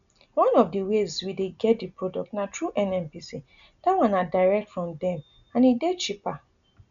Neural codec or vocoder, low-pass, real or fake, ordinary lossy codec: none; 7.2 kHz; real; none